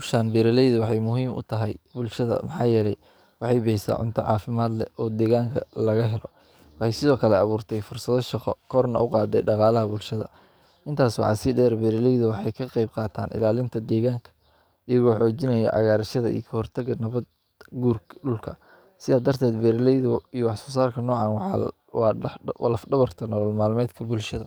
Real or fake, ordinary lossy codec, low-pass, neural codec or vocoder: fake; none; none; codec, 44.1 kHz, 7.8 kbps, DAC